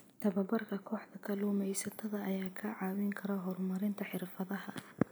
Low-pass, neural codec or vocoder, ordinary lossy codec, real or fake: none; none; none; real